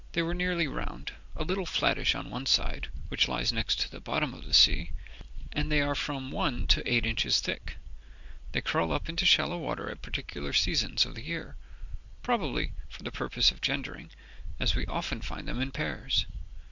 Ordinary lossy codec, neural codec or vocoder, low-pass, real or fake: Opus, 64 kbps; none; 7.2 kHz; real